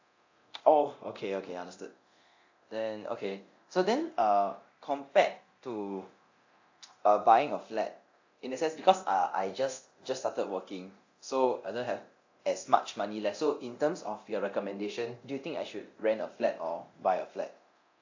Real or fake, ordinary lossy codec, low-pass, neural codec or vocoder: fake; AAC, 48 kbps; 7.2 kHz; codec, 24 kHz, 0.9 kbps, DualCodec